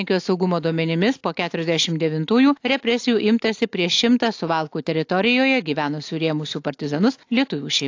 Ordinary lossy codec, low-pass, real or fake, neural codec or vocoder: AAC, 48 kbps; 7.2 kHz; real; none